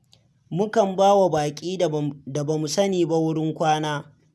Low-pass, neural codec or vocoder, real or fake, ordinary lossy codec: none; none; real; none